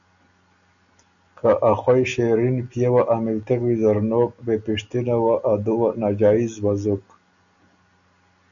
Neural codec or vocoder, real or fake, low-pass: none; real; 7.2 kHz